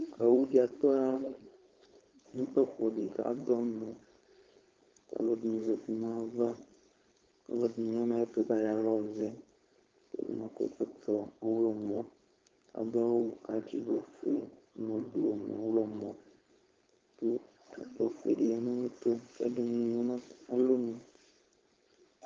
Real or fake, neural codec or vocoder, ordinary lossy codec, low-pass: fake; codec, 16 kHz, 4.8 kbps, FACodec; Opus, 32 kbps; 7.2 kHz